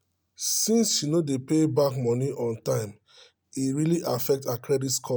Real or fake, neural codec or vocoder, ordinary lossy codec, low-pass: real; none; none; none